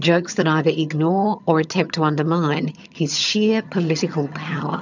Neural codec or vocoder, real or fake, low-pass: vocoder, 22.05 kHz, 80 mel bands, HiFi-GAN; fake; 7.2 kHz